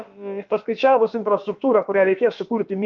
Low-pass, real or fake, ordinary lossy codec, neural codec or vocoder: 7.2 kHz; fake; Opus, 24 kbps; codec, 16 kHz, about 1 kbps, DyCAST, with the encoder's durations